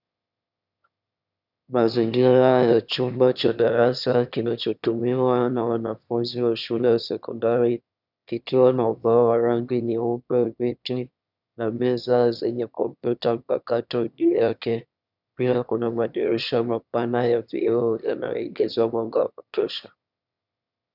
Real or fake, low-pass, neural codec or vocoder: fake; 5.4 kHz; autoencoder, 22.05 kHz, a latent of 192 numbers a frame, VITS, trained on one speaker